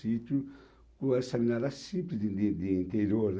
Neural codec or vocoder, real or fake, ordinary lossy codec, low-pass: none; real; none; none